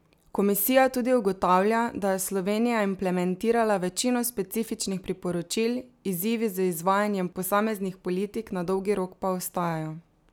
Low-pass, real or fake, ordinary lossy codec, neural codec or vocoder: none; real; none; none